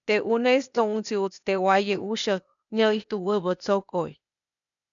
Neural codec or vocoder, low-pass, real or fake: codec, 16 kHz, 0.8 kbps, ZipCodec; 7.2 kHz; fake